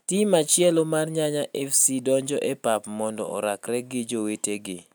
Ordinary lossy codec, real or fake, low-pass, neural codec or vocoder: none; real; none; none